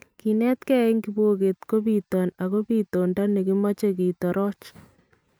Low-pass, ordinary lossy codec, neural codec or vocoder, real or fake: none; none; vocoder, 44.1 kHz, 128 mel bands every 512 samples, BigVGAN v2; fake